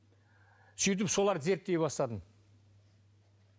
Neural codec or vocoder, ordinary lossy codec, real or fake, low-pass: none; none; real; none